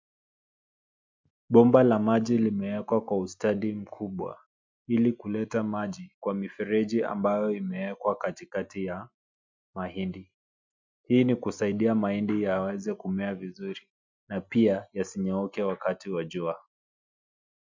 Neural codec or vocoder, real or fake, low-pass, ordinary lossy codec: none; real; 7.2 kHz; MP3, 64 kbps